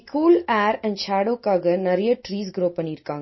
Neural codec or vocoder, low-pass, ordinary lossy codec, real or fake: none; 7.2 kHz; MP3, 24 kbps; real